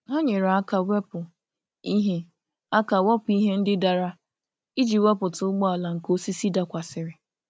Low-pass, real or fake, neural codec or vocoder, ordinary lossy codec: none; real; none; none